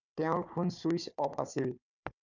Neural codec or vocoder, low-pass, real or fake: codec, 16 kHz, 4 kbps, FunCodec, trained on Chinese and English, 50 frames a second; 7.2 kHz; fake